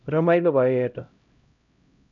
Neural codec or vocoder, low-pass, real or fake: codec, 16 kHz, 0.5 kbps, X-Codec, HuBERT features, trained on LibriSpeech; 7.2 kHz; fake